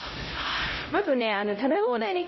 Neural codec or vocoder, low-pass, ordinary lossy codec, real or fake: codec, 16 kHz, 0.5 kbps, X-Codec, HuBERT features, trained on LibriSpeech; 7.2 kHz; MP3, 24 kbps; fake